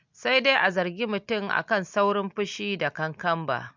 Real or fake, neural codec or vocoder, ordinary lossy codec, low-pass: real; none; MP3, 64 kbps; 7.2 kHz